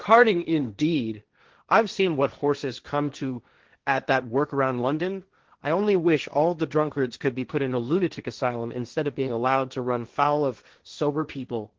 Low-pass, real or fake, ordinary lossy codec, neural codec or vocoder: 7.2 kHz; fake; Opus, 16 kbps; codec, 16 kHz, 1.1 kbps, Voila-Tokenizer